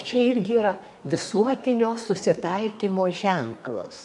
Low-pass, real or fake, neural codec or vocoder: 10.8 kHz; fake; codec, 24 kHz, 1 kbps, SNAC